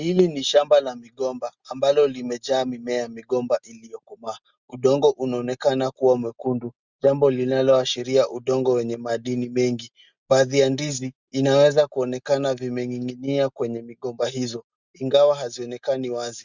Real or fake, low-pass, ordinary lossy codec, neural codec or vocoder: real; 7.2 kHz; Opus, 64 kbps; none